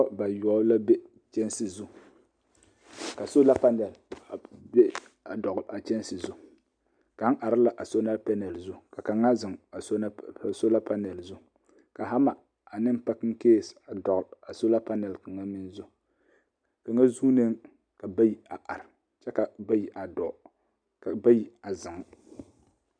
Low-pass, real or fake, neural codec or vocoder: 9.9 kHz; real; none